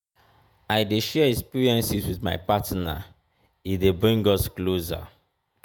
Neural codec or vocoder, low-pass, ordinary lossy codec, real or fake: vocoder, 48 kHz, 128 mel bands, Vocos; none; none; fake